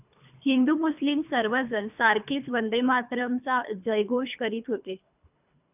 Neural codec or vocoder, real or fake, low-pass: codec, 24 kHz, 3 kbps, HILCodec; fake; 3.6 kHz